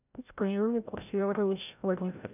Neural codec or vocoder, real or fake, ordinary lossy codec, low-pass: codec, 16 kHz, 0.5 kbps, FreqCodec, larger model; fake; none; 3.6 kHz